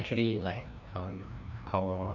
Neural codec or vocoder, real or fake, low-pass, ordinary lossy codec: codec, 16 kHz, 1 kbps, FreqCodec, larger model; fake; 7.2 kHz; none